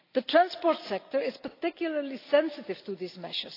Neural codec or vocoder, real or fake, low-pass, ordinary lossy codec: none; real; 5.4 kHz; AAC, 32 kbps